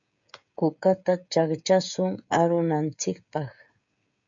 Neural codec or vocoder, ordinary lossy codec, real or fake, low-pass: codec, 16 kHz, 16 kbps, FreqCodec, smaller model; MP3, 64 kbps; fake; 7.2 kHz